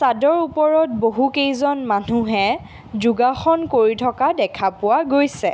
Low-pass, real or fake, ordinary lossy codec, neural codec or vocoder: none; real; none; none